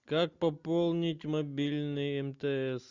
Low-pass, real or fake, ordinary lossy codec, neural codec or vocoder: 7.2 kHz; real; Opus, 64 kbps; none